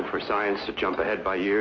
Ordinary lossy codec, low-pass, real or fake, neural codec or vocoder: MP3, 32 kbps; 7.2 kHz; real; none